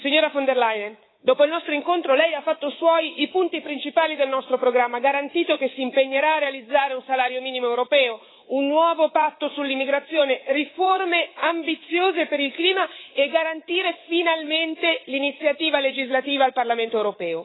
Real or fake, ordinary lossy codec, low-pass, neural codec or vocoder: fake; AAC, 16 kbps; 7.2 kHz; codec, 24 kHz, 3.1 kbps, DualCodec